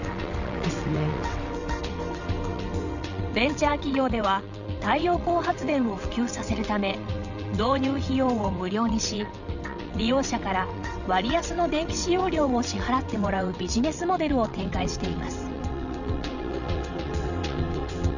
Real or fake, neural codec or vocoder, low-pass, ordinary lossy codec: fake; vocoder, 22.05 kHz, 80 mel bands, WaveNeXt; 7.2 kHz; none